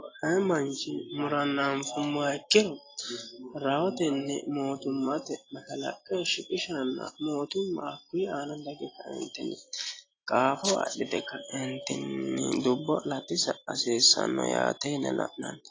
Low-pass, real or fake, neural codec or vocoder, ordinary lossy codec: 7.2 kHz; real; none; AAC, 32 kbps